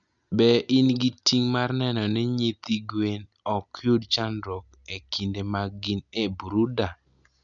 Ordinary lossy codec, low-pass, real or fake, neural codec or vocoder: none; 7.2 kHz; real; none